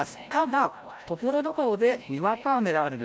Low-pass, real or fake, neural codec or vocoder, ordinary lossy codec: none; fake; codec, 16 kHz, 0.5 kbps, FreqCodec, larger model; none